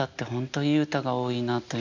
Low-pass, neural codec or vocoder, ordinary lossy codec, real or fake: 7.2 kHz; none; none; real